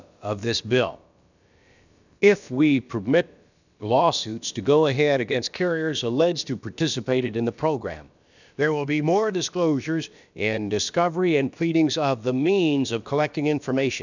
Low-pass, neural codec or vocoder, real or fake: 7.2 kHz; codec, 16 kHz, about 1 kbps, DyCAST, with the encoder's durations; fake